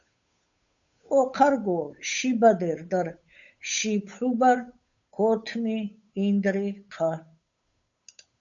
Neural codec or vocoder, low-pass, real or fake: codec, 16 kHz, 8 kbps, FunCodec, trained on Chinese and English, 25 frames a second; 7.2 kHz; fake